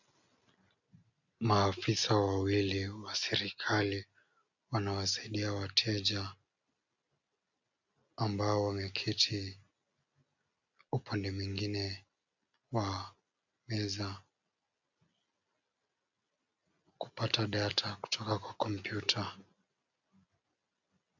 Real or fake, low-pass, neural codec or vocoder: real; 7.2 kHz; none